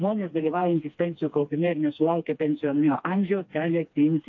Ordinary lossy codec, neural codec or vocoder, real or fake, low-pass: AAC, 48 kbps; codec, 16 kHz, 2 kbps, FreqCodec, smaller model; fake; 7.2 kHz